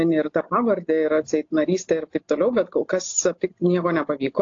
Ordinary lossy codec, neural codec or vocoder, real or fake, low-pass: AAC, 48 kbps; none; real; 7.2 kHz